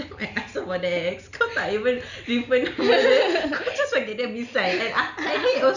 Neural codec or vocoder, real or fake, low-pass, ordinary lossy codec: none; real; 7.2 kHz; none